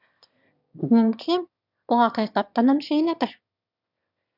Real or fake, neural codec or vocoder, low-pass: fake; autoencoder, 22.05 kHz, a latent of 192 numbers a frame, VITS, trained on one speaker; 5.4 kHz